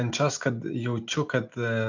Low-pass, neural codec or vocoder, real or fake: 7.2 kHz; none; real